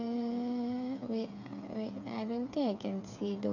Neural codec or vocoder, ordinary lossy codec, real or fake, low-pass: codec, 16 kHz, 8 kbps, FreqCodec, smaller model; none; fake; 7.2 kHz